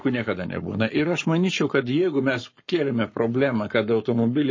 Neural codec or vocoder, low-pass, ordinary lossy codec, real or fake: codec, 44.1 kHz, 7.8 kbps, Pupu-Codec; 7.2 kHz; MP3, 32 kbps; fake